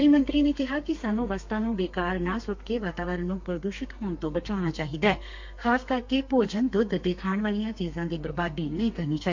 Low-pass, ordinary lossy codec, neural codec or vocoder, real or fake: 7.2 kHz; MP3, 48 kbps; codec, 32 kHz, 1.9 kbps, SNAC; fake